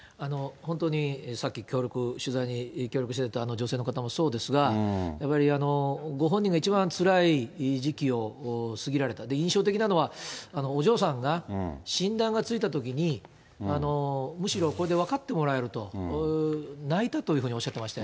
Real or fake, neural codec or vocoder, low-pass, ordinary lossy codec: real; none; none; none